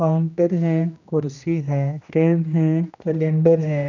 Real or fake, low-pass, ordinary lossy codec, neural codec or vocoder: fake; 7.2 kHz; none; codec, 16 kHz, 1 kbps, X-Codec, HuBERT features, trained on general audio